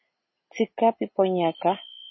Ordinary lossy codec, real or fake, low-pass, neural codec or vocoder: MP3, 24 kbps; real; 7.2 kHz; none